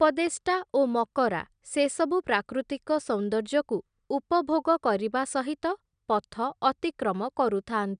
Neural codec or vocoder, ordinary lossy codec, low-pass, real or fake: none; none; 9.9 kHz; real